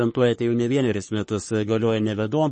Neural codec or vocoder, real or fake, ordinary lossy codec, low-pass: codec, 44.1 kHz, 3.4 kbps, Pupu-Codec; fake; MP3, 32 kbps; 10.8 kHz